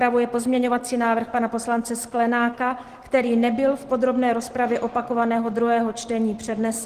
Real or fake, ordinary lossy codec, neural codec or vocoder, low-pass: real; Opus, 16 kbps; none; 14.4 kHz